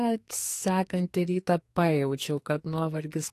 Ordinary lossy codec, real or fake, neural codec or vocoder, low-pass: AAC, 64 kbps; fake; codec, 44.1 kHz, 2.6 kbps, SNAC; 14.4 kHz